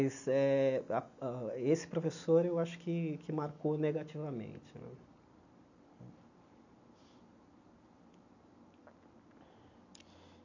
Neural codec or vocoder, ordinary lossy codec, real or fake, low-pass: none; none; real; 7.2 kHz